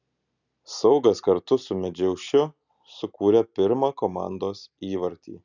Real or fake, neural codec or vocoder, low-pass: real; none; 7.2 kHz